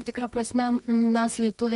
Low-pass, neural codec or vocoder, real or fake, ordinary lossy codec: 10.8 kHz; codec, 24 kHz, 0.9 kbps, WavTokenizer, medium music audio release; fake; MP3, 64 kbps